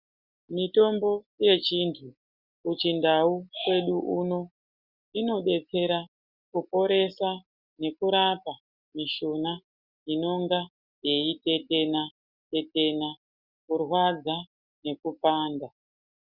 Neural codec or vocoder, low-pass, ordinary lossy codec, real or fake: none; 5.4 kHz; Opus, 64 kbps; real